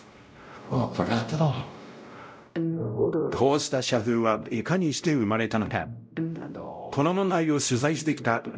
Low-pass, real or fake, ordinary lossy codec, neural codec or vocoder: none; fake; none; codec, 16 kHz, 0.5 kbps, X-Codec, WavLM features, trained on Multilingual LibriSpeech